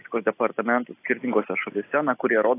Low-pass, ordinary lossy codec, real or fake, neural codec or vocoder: 3.6 kHz; AAC, 24 kbps; real; none